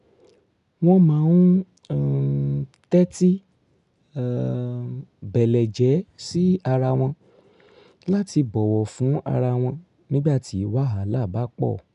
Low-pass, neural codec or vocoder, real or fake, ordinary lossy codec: 10.8 kHz; none; real; none